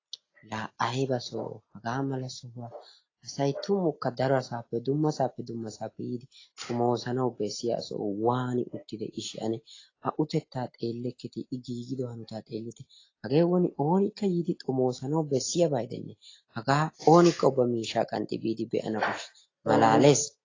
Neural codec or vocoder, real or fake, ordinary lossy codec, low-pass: none; real; AAC, 32 kbps; 7.2 kHz